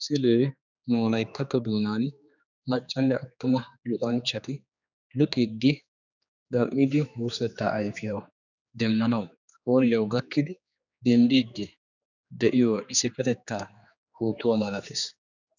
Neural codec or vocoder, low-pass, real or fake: codec, 16 kHz, 2 kbps, X-Codec, HuBERT features, trained on general audio; 7.2 kHz; fake